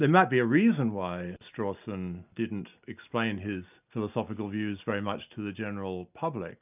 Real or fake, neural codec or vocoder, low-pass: real; none; 3.6 kHz